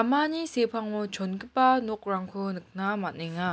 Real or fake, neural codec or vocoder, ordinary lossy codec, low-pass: real; none; none; none